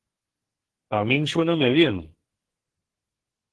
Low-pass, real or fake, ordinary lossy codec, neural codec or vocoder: 10.8 kHz; fake; Opus, 16 kbps; codec, 44.1 kHz, 2.6 kbps, SNAC